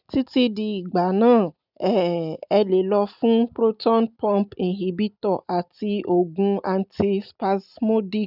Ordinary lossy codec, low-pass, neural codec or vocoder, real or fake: none; 5.4 kHz; none; real